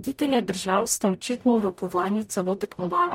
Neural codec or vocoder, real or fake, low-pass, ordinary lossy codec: codec, 44.1 kHz, 0.9 kbps, DAC; fake; 19.8 kHz; MP3, 64 kbps